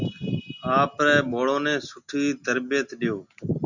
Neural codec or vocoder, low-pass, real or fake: none; 7.2 kHz; real